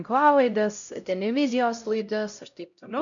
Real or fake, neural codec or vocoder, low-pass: fake; codec, 16 kHz, 0.5 kbps, X-Codec, HuBERT features, trained on LibriSpeech; 7.2 kHz